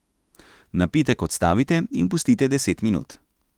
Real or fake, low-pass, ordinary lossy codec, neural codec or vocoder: fake; 19.8 kHz; Opus, 24 kbps; autoencoder, 48 kHz, 32 numbers a frame, DAC-VAE, trained on Japanese speech